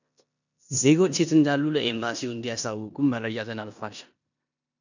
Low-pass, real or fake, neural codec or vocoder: 7.2 kHz; fake; codec, 16 kHz in and 24 kHz out, 0.9 kbps, LongCat-Audio-Codec, four codebook decoder